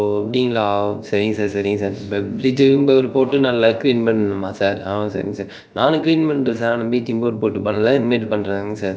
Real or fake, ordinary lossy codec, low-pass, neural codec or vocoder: fake; none; none; codec, 16 kHz, about 1 kbps, DyCAST, with the encoder's durations